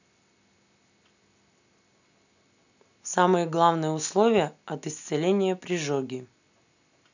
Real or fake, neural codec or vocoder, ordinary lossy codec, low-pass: real; none; none; 7.2 kHz